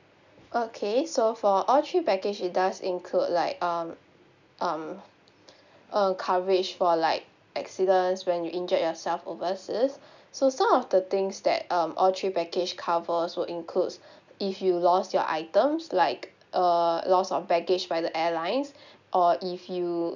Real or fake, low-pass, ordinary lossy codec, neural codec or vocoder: real; 7.2 kHz; none; none